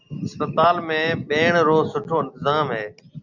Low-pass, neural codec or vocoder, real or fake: 7.2 kHz; none; real